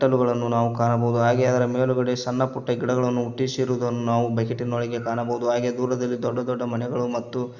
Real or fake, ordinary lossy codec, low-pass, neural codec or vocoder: real; Opus, 64 kbps; 7.2 kHz; none